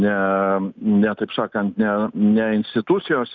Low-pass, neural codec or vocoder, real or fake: 7.2 kHz; none; real